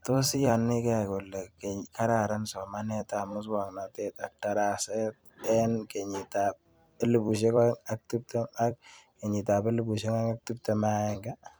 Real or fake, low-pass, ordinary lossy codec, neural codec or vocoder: fake; none; none; vocoder, 44.1 kHz, 128 mel bands every 256 samples, BigVGAN v2